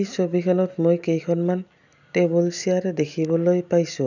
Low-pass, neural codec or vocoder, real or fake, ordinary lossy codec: 7.2 kHz; none; real; none